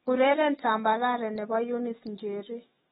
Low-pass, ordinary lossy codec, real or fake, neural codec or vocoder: 19.8 kHz; AAC, 16 kbps; fake; autoencoder, 48 kHz, 128 numbers a frame, DAC-VAE, trained on Japanese speech